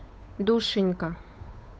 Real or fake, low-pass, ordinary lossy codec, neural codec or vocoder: fake; none; none; codec, 16 kHz, 8 kbps, FunCodec, trained on Chinese and English, 25 frames a second